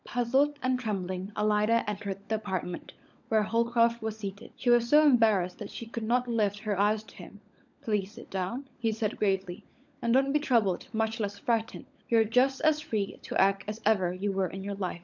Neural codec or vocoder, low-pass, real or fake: codec, 16 kHz, 16 kbps, FunCodec, trained on LibriTTS, 50 frames a second; 7.2 kHz; fake